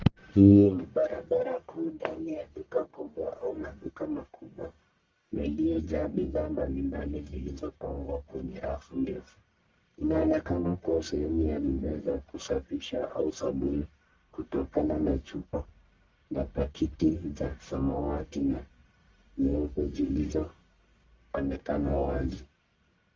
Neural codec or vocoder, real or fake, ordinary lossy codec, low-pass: codec, 44.1 kHz, 1.7 kbps, Pupu-Codec; fake; Opus, 32 kbps; 7.2 kHz